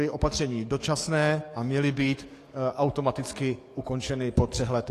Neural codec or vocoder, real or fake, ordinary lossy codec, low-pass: codec, 44.1 kHz, 7.8 kbps, DAC; fake; AAC, 48 kbps; 14.4 kHz